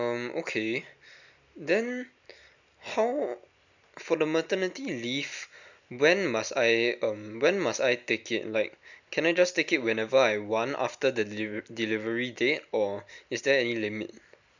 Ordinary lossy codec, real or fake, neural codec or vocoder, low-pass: none; real; none; 7.2 kHz